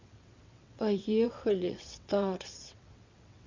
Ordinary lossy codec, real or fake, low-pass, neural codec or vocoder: MP3, 64 kbps; fake; 7.2 kHz; vocoder, 22.05 kHz, 80 mel bands, WaveNeXt